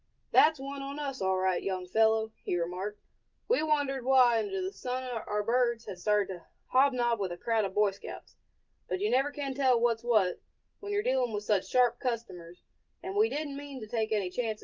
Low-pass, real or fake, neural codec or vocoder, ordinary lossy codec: 7.2 kHz; real; none; Opus, 24 kbps